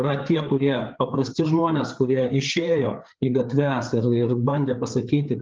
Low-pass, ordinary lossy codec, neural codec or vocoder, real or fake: 7.2 kHz; Opus, 24 kbps; codec, 16 kHz, 4 kbps, FreqCodec, larger model; fake